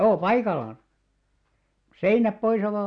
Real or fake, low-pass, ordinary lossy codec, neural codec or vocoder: real; 9.9 kHz; none; none